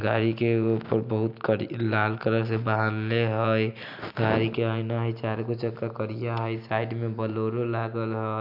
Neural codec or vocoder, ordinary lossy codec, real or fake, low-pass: none; none; real; 5.4 kHz